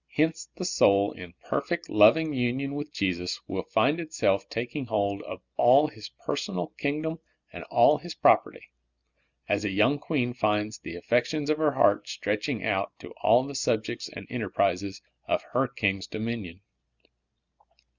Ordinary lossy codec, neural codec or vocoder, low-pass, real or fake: Opus, 64 kbps; none; 7.2 kHz; real